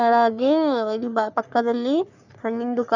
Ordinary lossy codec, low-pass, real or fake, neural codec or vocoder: none; 7.2 kHz; fake; codec, 44.1 kHz, 3.4 kbps, Pupu-Codec